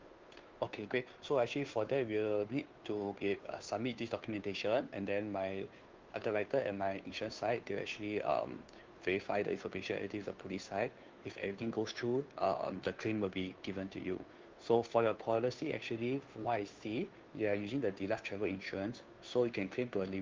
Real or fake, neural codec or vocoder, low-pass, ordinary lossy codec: fake; codec, 16 kHz, 2 kbps, FunCodec, trained on Chinese and English, 25 frames a second; 7.2 kHz; Opus, 32 kbps